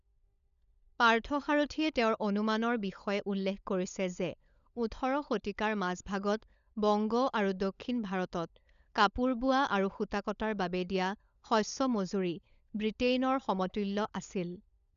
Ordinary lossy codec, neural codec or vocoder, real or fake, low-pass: none; codec, 16 kHz, 16 kbps, FunCodec, trained on LibriTTS, 50 frames a second; fake; 7.2 kHz